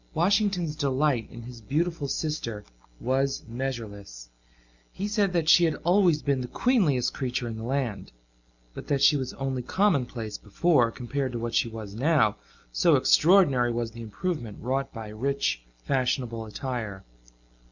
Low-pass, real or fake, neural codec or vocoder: 7.2 kHz; real; none